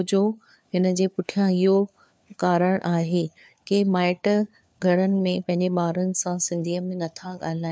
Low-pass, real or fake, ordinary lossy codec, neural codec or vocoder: none; fake; none; codec, 16 kHz, 4 kbps, FunCodec, trained on LibriTTS, 50 frames a second